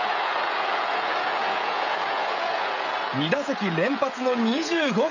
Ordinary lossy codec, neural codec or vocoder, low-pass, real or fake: none; codec, 16 kHz, 16 kbps, FreqCodec, smaller model; 7.2 kHz; fake